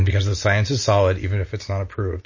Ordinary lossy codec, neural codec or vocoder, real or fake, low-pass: MP3, 32 kbps; none; real; 7.2 kHz